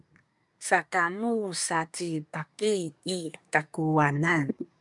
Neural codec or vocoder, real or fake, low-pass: codec, 24 kHz, 1 kbps, SNAC; fake; 10.8 kHz